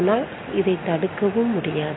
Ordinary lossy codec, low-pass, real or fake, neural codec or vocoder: AAC, 16 kbps; 7.2 kHz; real; none